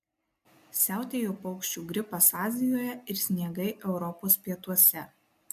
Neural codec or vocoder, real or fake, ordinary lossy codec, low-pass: none; real; MP3, 96 kbps; 14.4 kHz